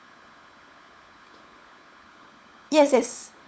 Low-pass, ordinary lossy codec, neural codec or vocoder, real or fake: none; none; codec, 16 kHz, 16 kbps, FunCodec, trained on LibriTTS, 50 frames a second; fake